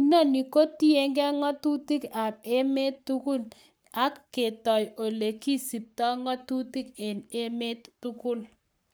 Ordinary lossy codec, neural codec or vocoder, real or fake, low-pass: none; codec, 44.1 kHz, 7.8 kbps, Pupu-Codec; fake; none